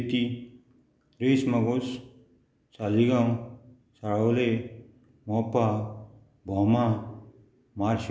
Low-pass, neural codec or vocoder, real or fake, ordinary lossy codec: none; none; real; none